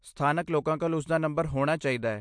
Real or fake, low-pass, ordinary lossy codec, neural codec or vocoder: real; 9.9 kHz; MP3, 64 kbps; none